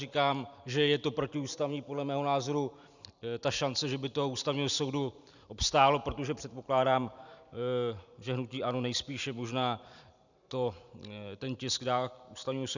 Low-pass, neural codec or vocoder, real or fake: 7.2 kHz; vocoder, 44.1 kHz, 128 mel bands every 512 samples, BigVGAN v2; fake